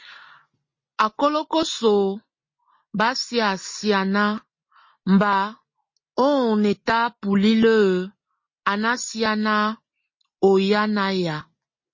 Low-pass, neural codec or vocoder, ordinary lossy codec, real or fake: 7.2 kHz; none; MP3, 32 kbps; real